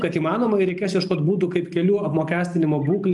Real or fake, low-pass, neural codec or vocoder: real; 10.8 kHz; none